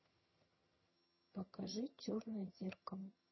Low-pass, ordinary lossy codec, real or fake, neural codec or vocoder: 7.2 kHz; MP3, 24 kbps; fake; vocoder, 22.05 kHz, 80 mel bands, HiFi-GAN